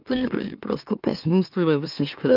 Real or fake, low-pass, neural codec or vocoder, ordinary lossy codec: fake; 5.4 kHz; autoencoder, 44.1 kHz, a latent of 192 numbers a frame, MeloTTS; AAC, 48 kbps